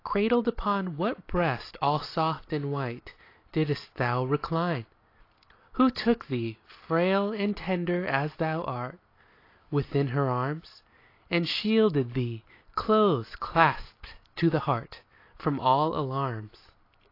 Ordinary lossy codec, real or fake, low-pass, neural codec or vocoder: AAC, 32 kbps; real; 5.4 kHz; none